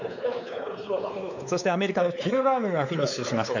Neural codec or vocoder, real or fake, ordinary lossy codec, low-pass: codec, 16 kHz, 4 kbps, X-Codec, WavLM features, trained on Multilingual LibriSpeech; fake; none; 7.2 kHz